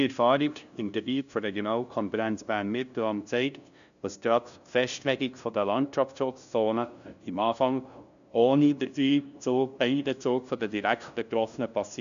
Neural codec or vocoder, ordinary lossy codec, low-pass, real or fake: codec, 16 kHz, 0.5 kbps, FunCodec, trained on LibriTTS, 25 frames a second; none; 7.2 kHz; fake